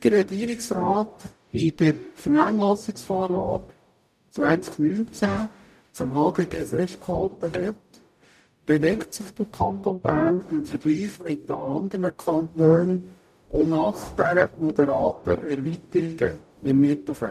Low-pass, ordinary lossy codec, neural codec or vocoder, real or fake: 14.4 kHz; none; codec, 44.1 kHz, 0.9 kbps, DAC; fake